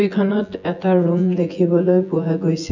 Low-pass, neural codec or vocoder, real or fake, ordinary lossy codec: 7.2 kHz; vocoder, 24 kHz, 100 mel bands, Vocos; fake; none